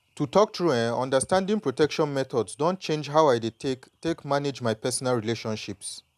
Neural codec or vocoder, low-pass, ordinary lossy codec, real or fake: none; 14.4 kHz; none; real